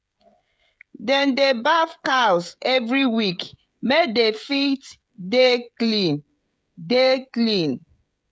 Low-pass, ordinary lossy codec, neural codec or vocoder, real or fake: none; none; codec, 16 kHz, 16 kbps, FreqCodec, smaller model; fake